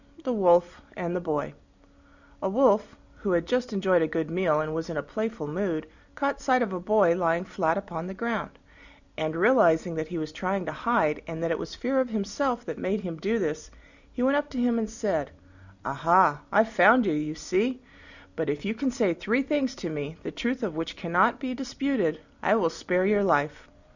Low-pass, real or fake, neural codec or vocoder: 7.2 kHz; real; none